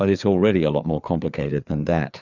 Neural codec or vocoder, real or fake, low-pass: codec, 16 kHz, 4 kbps, FreqCodec, larger model; fake; 7.2 kHz